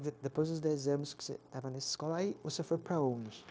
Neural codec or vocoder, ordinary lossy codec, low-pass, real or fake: codec, 16 kHz, 0.9 kbps, LongCat-Audio-Codec; none; none; fake